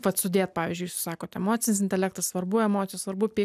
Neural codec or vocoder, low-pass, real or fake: none; 14.4 kHz; real